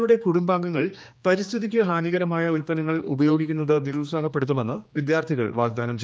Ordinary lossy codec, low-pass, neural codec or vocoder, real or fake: none; none; codec, 16 kHz, 2 kbps, X-Codec, HuBERT features, trained on general audio; fake